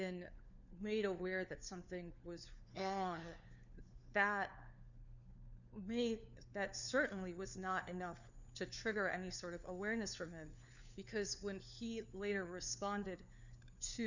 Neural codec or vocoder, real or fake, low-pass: codec, 16 kHz, 4 kbps, FunCodec, trained on LibriTTS, 50 frames a second; fake; 7.2 kHz